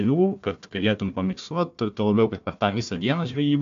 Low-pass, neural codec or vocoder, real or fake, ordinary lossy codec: 7.2 kHz; codec, 16 kHz, 1 kbps, FunCodec, trained on Chinese and English, 50 frames a second; fake; MP3, 64 kbps